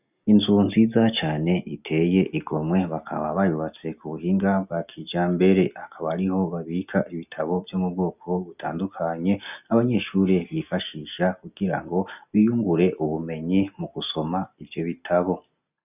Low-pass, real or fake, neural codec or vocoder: 3.6 kHz; real; none